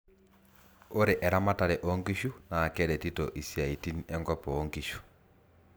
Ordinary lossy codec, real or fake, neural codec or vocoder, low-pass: none; real; none; none